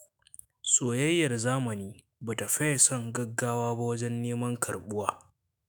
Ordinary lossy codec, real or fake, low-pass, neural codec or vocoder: none; fake; none; autoencoder, 48 kHz, 128 numbers a frame, DAC-VAE, trained on Japanese speech